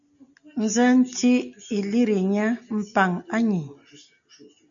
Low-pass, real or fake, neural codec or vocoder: 7.2 kHz; real; none